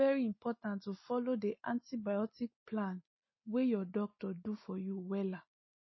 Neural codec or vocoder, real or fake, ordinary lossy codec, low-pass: none; real; MP3, 24 kbps; 7.2 kHz